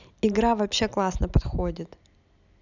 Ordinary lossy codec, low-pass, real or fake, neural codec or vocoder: none; 7.2 kHz; real; none